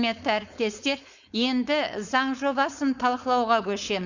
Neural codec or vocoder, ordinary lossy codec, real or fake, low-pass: codec, 16 kHz, 4.8 kbps, FACodec; Opus, 64 kbps; fake; 7.2 kHz